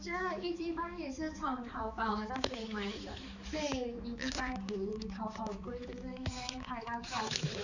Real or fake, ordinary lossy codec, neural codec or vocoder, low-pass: fake; none; codec, 16 kHz, 4 kbps, X-Codec, HuBERT features, trained on balanced general audio; 7.2 kHz